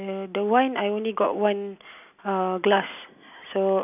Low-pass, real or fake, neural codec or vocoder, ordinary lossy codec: 3.6 kHz; real; none; none